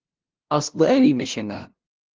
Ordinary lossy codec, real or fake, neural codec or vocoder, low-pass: Opus, 16 kbps; fake; codec, 16 kHz, 0.5 kbps, FunCodec, trained on LibriTTS, 25 frames a second; 7.2 kHz